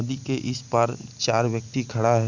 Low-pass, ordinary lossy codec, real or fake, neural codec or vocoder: 7.2 kHz; none; real; none